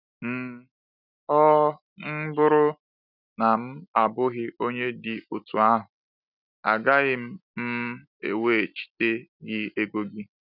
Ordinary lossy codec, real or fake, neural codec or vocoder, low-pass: none; real; none; 5.4 kHz